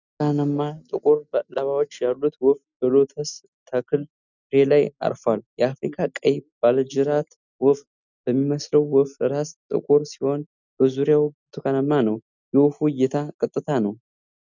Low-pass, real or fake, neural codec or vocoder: 7.2 kHz; real; none